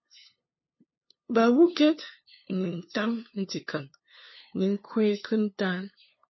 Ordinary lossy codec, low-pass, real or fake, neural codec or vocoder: MP3, 24 kbps; 7.2 kHz; fake; codec, 16 kHz, 2 kbps, FunCodec, trained on LibriTTS, 25 frames a second